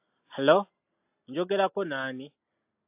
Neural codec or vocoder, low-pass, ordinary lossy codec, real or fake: none; 3.6 kHz; AAC, 32 kbps; real